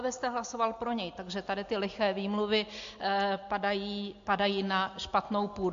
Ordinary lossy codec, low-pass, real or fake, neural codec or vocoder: MP3, 48 kbps; 7.2 kHz; real; none